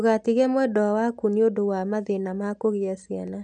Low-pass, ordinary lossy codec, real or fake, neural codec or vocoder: 9.9 kHz; none; real; none